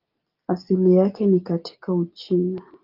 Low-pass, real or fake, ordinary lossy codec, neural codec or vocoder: 5.4 kHz; real; Opus, 24 kbps; none